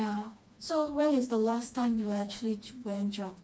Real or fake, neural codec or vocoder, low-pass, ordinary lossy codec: fake; codec, 16 kHz, 2 kbps, FreqCodec, smaller model; none; none